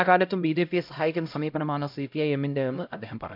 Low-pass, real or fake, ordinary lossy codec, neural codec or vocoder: 5.4 kHz; fake; none; codec, 16 kHz, 0.5 kbps, X-Codec, HuBERT features, trained on LibriSpeech